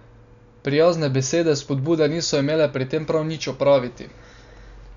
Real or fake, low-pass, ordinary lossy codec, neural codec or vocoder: real; 7.2 kHz; none; none